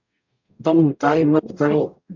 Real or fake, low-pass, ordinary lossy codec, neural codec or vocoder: fake; 7.2 kHz; MP3, 64 kbps; codec, 44.1 kHz, 0.9 kbps, DAC